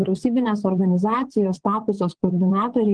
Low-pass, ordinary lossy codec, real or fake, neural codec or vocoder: 9.9 kHz; Opus, 16 kbps; fake; vocoder, 22.05 kHz, 80 mel bands, WaveNeXt